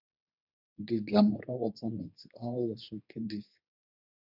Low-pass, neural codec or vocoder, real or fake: 5.4 kHz; codec, 24 kHz, 0.9 kbps, WavTokenizer, medium speech release version 2; fake